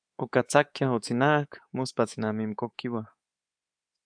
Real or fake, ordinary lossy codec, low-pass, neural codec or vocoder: fake; AAC, 64 kbps; 9.9 kHz; codec, 24 kHz, 3.1 kbps, DualCodec